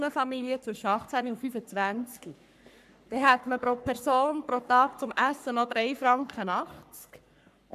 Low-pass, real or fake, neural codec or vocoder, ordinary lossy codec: 14.4 kHz; fake; codec, 44.1 kHz, 3.4 kbps, Pupu-Codec; none